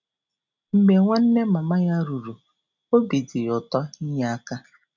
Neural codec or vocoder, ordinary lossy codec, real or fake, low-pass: none; none; real; 7.2 kHz